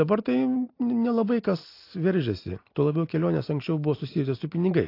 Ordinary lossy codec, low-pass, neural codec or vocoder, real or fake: AAC, 32 kbps; 5.4 kHz; none; real